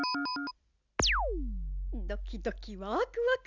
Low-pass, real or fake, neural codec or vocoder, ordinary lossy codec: 7.2 kHz; real; none; none